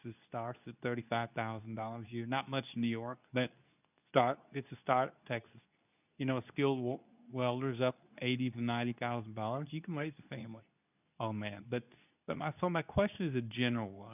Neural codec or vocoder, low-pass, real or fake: codec, 24 kHz, 0.9 kbps, WavTokenizer, medium speech release version 2; 3.6 kHz; fake